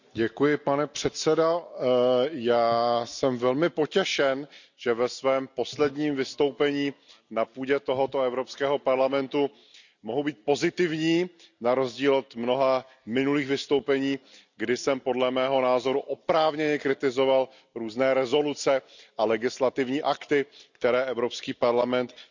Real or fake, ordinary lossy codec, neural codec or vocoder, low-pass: real; none; none; 7.2 kHz